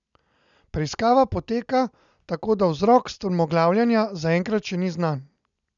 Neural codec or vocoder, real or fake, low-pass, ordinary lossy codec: none; real; 7.2 kHz; none